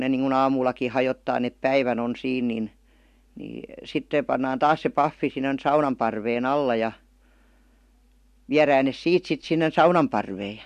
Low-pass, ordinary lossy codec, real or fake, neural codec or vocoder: 14.4 kHz; MP3, 64 kbps; real; none